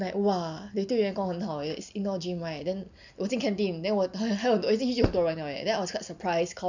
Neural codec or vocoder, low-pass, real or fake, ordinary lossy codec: none; 7.2 kHz; real; none